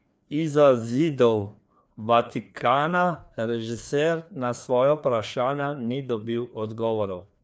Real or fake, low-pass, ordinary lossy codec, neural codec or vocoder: fake; none; none; codec, 16 kHz, 2 kbps, FreqCodec, larger model